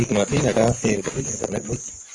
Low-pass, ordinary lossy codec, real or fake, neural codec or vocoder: 10.8 kHz; AAC, 32 kbps; fake; vocoder, 24 kHz, 100 mel bands, Vocos